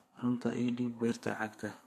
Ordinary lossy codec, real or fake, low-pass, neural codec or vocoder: MP3, 64 kbps; fake; 14.4 kHz; codec, 32 kHz, 1.9 kbps, SNAC